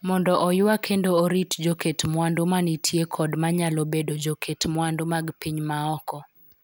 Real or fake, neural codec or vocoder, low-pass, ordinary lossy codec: real; none; none; none